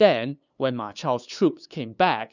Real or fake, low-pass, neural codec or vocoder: fake; 7.2 kHz; codec, 16 kHz, 2 kbps, FunCodec, trained on LibriTTS, 25 frames a second